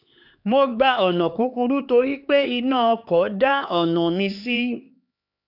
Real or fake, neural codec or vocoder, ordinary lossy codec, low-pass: fake; codec, 16 kHz, 4 kbps, X-Codec, HuBERT features, trained on LibriSpeech; none; 5.4 kHz